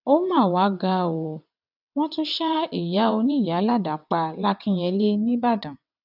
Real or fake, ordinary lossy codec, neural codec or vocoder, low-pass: fake; none; vocoder, 22.05 kHz, 80 mel bands, WaveNeXt; 5.4 kHz